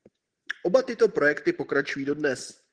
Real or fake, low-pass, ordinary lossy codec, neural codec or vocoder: real; 9.9 kHz; Opus, 16 kbps; none